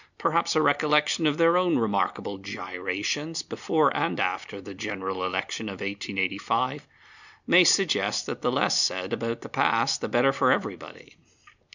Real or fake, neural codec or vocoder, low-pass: real; none; 7.2 kHz